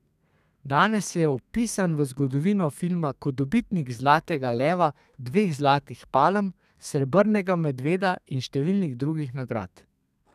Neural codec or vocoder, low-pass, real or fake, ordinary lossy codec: codec, 32 kHz, 1.9 kbps, SNAC; 14.4 kHz; fake; none